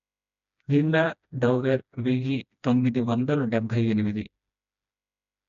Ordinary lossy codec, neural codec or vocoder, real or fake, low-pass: none; codec, 16 kHz, 1 kbps, FreqCodec, smaller model; fake; 7.2 kHz